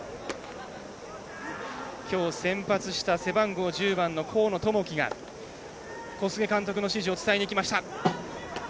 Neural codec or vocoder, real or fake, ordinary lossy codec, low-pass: none; real; none; none